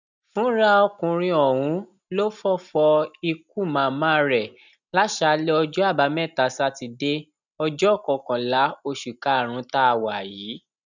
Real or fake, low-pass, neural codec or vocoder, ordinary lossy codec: real; 7.2 kHz; none; none